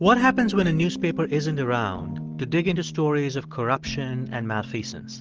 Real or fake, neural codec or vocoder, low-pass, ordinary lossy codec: real; none; 7.2 kHz; Opus, 16 kbps